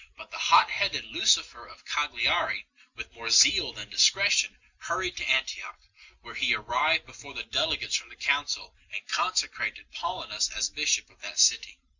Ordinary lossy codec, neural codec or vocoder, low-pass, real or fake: Opus, 64 kbps; none; 7.2 kHz; real